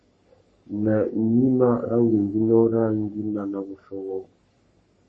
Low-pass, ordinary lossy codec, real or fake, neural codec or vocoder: 10.8 kHz; MP3, 32 kbps; fake; codec, 44.1 kHz, 3.4 kbps, Pupu-Codec